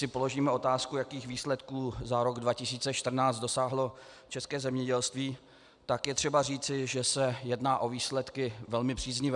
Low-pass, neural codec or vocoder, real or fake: 10.8 kHz; none; real